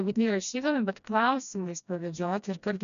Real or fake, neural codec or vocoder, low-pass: fake; codec, 16 kHz, 1 kbps, FreqCodec, smaller model; 7.2 kHz